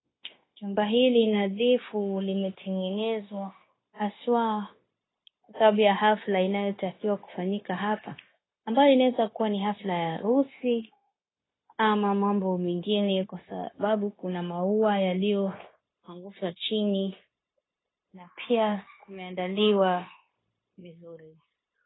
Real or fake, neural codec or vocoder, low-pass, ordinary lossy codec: fake; codec, 16 kHz, 0.9 kbps, LongCat-Audio-Codec; 7.2 kHz; AAC, 16 kbps